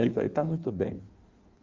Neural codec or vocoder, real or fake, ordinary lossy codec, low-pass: codec, 16 kHz in and 24 kHz out, 1.1 kbps, FireRedTTS-2 codec; fake; Opus, 32 kbps; 7.2 kHz